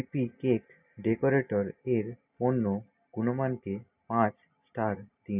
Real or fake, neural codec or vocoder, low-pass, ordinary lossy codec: real; none; 3.6 kHz; none